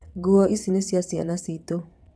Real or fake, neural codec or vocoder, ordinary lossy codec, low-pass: fake; vocoder, 22.05 kHz, 80 mel bands, WaveNeXt; none; none